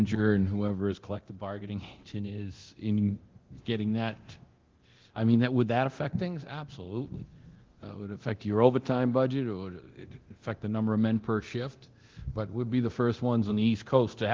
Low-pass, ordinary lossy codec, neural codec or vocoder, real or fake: 7.2 kHz; Opus, 16 kbps; codec, 24 kHz, 0.9 kbps, DualCodec; fake